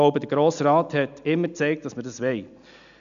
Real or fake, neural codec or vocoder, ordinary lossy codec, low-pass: real; none; none; 7.2 kHz